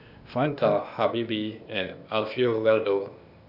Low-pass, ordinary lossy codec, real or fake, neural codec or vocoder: 5.4 kHz; none; fake; codec, 16 kHz, 0.8 kbps, ZipCodec